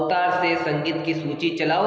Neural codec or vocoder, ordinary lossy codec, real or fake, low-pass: none; none; real; none